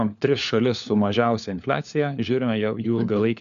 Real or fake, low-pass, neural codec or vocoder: fake; 7.2 kHz; codec, 16 kHz, 4 kbps, FunCodec, trained on LibriTTS, 50 frames a second